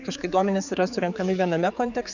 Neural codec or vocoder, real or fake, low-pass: codec, 16 kHz, 4 kbps, X-Codec, HuBERT features, trained on general audio; fake; 7.2 kHz